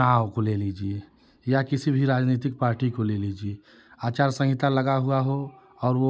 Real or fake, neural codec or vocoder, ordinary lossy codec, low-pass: real; none; none; none